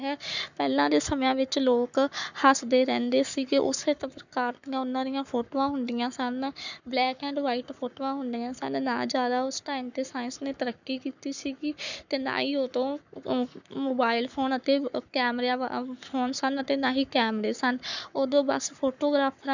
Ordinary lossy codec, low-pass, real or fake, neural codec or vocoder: none; 7.2 kHz; fake; codec, 44.1 kHz, 7.8 kbps, Pupu-Codec